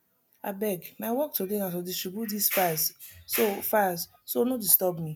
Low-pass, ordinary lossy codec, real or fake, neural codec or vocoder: none; none; real; none